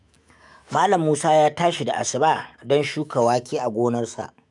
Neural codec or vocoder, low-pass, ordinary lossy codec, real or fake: autoencoder, 48 kHz, 128 numbers a frame, DAC-VAE, trained on Japanese speech; 10.8 kHz; none; fake